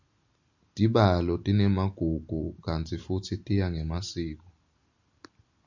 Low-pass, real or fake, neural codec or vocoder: 7.2 kHz; real; none